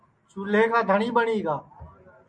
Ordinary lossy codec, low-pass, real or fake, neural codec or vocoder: MP3, 64 kbps; 9.9 kHz; real; none